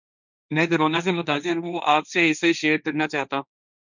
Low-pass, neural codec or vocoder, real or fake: 7.2 kHz; codec, 16 kHz, 1.1 kbps, Voila-Tokenizer; fake